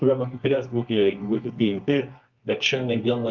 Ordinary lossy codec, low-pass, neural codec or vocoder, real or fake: Opus, 24 kbps; 7.2 kHz; codec, 24 kHz, 0.9 kbps, WavTokenizer, medium music audio release; fake